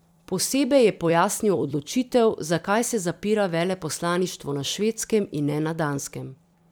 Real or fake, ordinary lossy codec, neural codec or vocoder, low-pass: fake; none; vocoder, 44.1 kHz, 128 mel bands every 256 samples, BigVGAN v2; none